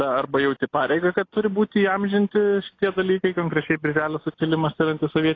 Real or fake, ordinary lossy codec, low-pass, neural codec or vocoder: real; AAC, 32 kbps; 7.2 kHz; none